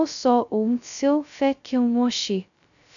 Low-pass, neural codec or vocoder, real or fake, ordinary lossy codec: 7.2 kHz; codec, 16 kHz, 0.2 kbps, FocalCodec; fake; none